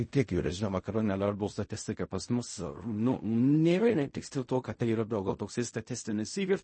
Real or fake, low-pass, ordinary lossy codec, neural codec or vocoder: fake; 9.9 kHz; MP3, 32 kbps; codec, 16 kHz in and 24 kHz out, 0.4 kbps, LongCat-Audio-Codec, fine tuned four codebook decoder